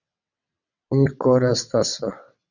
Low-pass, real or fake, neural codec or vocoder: 7.2 kHz; fake; vocoder, 22.05 kHz, 80 mel bands, WaveNeXt